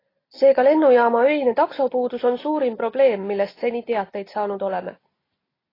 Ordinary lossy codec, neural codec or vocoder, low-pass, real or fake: AAC, 24 kbps; none; 5.4 kHz; real